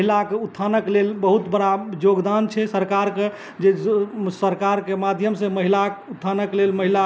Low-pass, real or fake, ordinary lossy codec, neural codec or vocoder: none; real; none; none